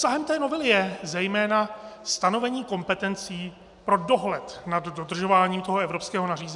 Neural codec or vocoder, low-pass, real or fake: none; 10.8 kHz; real